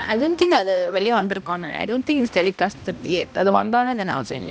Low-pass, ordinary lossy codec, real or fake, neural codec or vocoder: none; none; fake; codec, 16 kHz, 1 kbps, X-Codec, HuBERT features, trained on balanced general audio